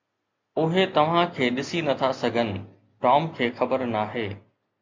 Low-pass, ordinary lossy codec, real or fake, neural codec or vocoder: 7.2 kHz; MP3, 48 kbps; real; none